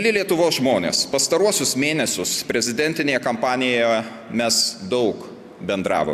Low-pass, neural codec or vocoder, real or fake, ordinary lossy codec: 14.4 kHz; none; real; AAC, 96 kbps